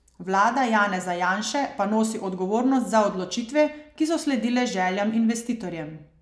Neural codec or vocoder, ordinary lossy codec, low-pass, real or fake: none; none; none; real